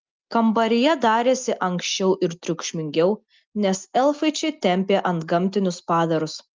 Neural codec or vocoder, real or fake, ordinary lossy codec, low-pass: none; real; Opus, 24 kbps; 7.2 kHz